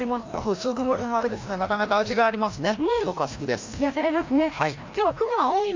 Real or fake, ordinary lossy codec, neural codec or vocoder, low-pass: fake; MP3, 48 kbps; codec, 16 kHz, 1 kbps, FreqCodec, larger model; 7.2 kHz